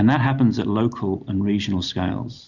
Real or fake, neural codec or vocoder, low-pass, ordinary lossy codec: real; none; 7.2 kHz; Opus, 64 kbps